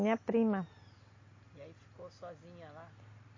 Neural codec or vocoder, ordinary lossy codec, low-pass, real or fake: none; MP3, 32 kbps; 7.2 kHz; real